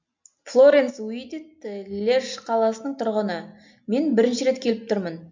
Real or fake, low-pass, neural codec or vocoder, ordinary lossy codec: real; 7.2 kHz; none; MP3, 64 kbps